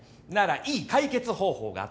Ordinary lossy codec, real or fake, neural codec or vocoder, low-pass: none; real; none; none